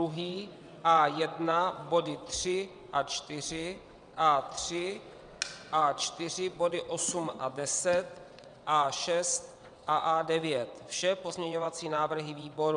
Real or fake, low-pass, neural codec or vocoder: fake; 9.9 kHz; vocoder, 22.05 kHz, 80 mel bands, WaveNeXt